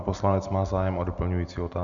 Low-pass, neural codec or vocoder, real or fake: 7.2 kHz; none; real